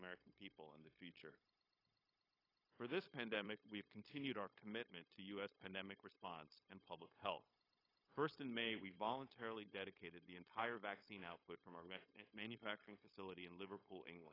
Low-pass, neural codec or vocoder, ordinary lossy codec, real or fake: 5.4 kHz; codec, 16 kHz, 0.9 kbps, LongCat-Audio-Codec; AAC, 24 kbps; fake